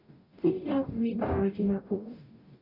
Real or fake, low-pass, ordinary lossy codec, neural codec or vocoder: fake; 5.4 kHz; none; codec, 44.1 kHz, 0.9 kbps, DAC